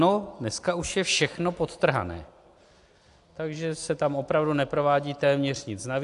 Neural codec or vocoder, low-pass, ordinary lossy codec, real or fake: none; 10.8 kHz; AAC, 64 kbps; real